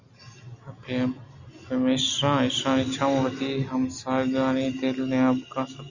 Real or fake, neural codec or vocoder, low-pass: real; none; 7.2 kHz